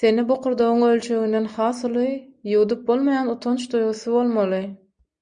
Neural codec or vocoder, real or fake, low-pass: none; real; 9.9 kHz